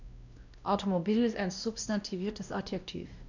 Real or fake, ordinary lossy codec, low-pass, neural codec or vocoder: fake; none; 7.2 kHz; codec, 16 kHz, 1 kbps, X-Codec, WavLM features, trained on Multilingual LibriSpeech